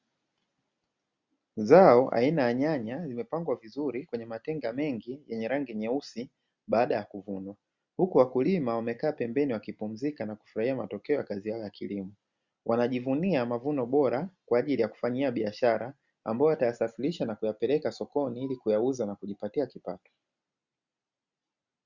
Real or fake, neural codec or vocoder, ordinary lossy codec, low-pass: real; none; Opus, 64 kbps; 7.2 kHz